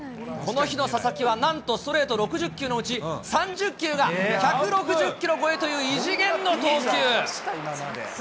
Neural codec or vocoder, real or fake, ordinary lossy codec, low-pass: none; real; none; none